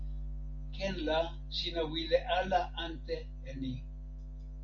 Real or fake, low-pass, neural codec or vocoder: real; 7.2 kHz; none